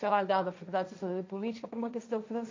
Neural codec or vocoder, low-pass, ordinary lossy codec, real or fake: codec, 16 kHz, 1.1 kbps, Voila-Tokenizer; 7.2 kHz; MP3, 48 kbps; fake